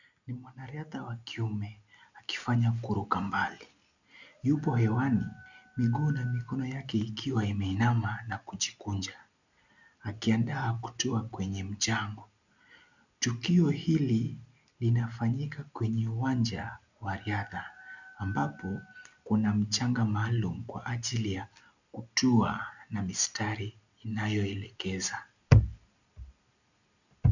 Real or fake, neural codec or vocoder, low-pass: real; none; 7.2 kHz